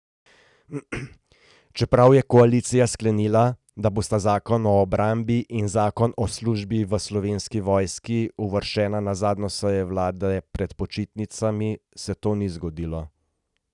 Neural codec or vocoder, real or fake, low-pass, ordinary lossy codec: none; real; 10.8 kHz; none